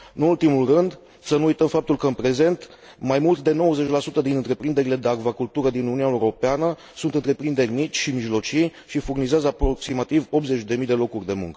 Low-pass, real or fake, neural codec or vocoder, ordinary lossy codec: none; real; none; none